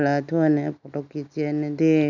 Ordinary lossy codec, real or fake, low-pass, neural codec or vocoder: none; real; 7.2 kHz; none